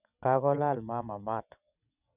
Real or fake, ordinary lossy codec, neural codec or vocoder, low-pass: fake; none; vocoder, 22.05 kHz, 80 mel bands, WaveNeXt; 3.6 kHz